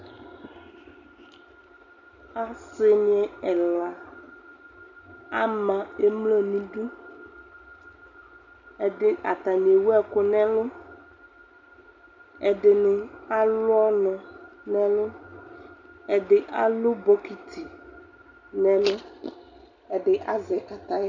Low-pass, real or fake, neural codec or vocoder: 7.2 kHz; real; none